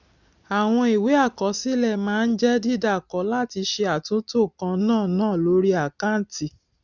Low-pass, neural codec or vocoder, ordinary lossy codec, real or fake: 7.2 kHz; none; none; real